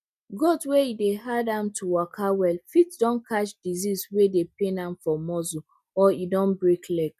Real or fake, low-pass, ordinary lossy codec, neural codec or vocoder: real; 14.4 kHz; none; none